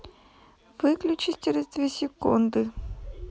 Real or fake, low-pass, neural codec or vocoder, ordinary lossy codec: real; none; none; none